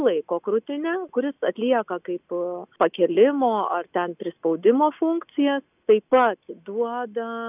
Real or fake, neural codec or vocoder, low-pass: real; none; 3.6 kHz